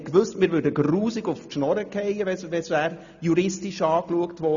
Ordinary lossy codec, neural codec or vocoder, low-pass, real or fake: none; none; 7.2 kHz; real